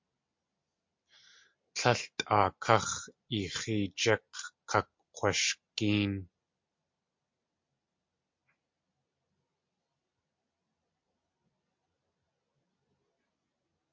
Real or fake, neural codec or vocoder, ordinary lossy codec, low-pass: real; none; MP3, 48 kbps; 7.2 kHz